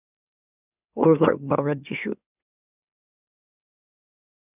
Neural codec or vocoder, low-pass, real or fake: autoencoder, 44.1 kHz, a latent of 192 numbers a frame, MeloTTS; 3.6 kHz; fake